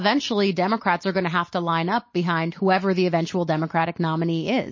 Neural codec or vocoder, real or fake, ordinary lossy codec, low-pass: none; real; MP3, 32 kbps; 7.2 kHz